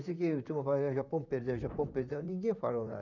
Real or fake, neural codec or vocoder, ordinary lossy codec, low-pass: fake; vocoder, 44.1 kHz, 128 mel bands, Pupu-Vocoder; none; 7.2 kHz